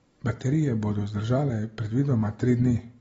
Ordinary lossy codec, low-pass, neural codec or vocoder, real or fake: AAC, 24 kbps; 19.8 kHz; none; real